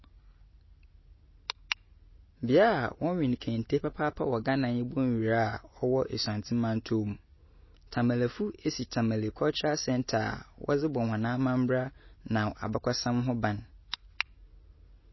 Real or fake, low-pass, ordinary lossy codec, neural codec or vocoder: real; 7.2 kHz; MP3, 24 kbps; none